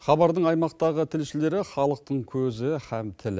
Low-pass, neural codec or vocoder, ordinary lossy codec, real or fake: none; none; none; real